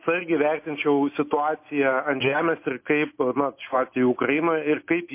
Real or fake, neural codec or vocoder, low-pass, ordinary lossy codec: real; none; 3.6 kHz; MP3, 24 kbps